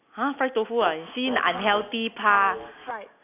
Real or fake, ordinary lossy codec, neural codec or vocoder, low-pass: real; none; none; 3.6 kHz